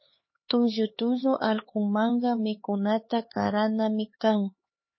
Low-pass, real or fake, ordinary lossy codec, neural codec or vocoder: 7.2 kHz; fake; MP3, 24 kbps; codec, 16 kHz, 4 kbps, X-Codec, HuBERT features, trained on LibriSpeech